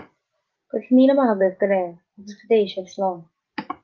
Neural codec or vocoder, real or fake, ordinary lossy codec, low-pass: none; real; Opus, 32 kbps; 7.2 kHz